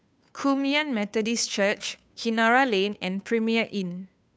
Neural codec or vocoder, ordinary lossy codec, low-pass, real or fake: codec, 16 kHz, 2 kbps, FunCodec, trained on Chinese and English, 25 frames a second; none; none; fake